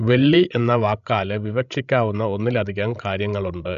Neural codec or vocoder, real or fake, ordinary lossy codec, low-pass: codec, 16 kHz, 16 kbps, FreqCodec, larger model; fake; none; 7.2 kHz